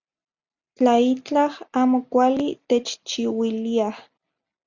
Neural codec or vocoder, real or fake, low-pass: none; real; 7.2 kHz